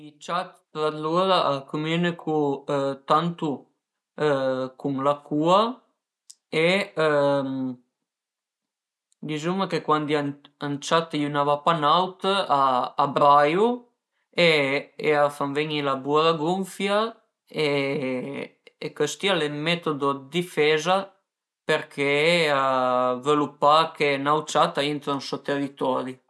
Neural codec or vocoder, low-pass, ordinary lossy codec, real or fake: none; none; none; real